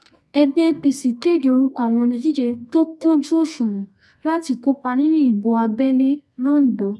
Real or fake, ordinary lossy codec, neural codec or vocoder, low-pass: fake; none; codec, 24 kHz, 0.9 kbps, WavTokenizer, medium music audio release; none